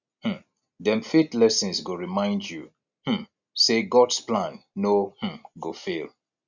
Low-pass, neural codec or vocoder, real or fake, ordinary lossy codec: 7.2 kHz; none; real; none